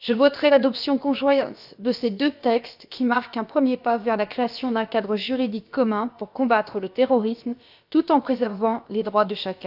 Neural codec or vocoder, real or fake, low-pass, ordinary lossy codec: codec, 16 kHz, about 1 kbps, DyCAST, with the encoder's durations; fake; 5.4 kHz; none